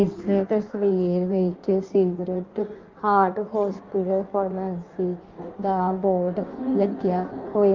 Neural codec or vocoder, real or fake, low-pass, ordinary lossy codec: codec, 16 kHz in and 24 kHz out, 1.1 kbps, FireRedTTS-2 codec; fake; 7.2 kHz; Opus, 32 kbps